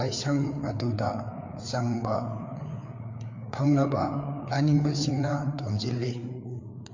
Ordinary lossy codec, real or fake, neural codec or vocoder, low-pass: MP3, 48 kbps; fake; codec, 16 kHz, 4 kbps, FreqCodec, larger model; 7.2 kHz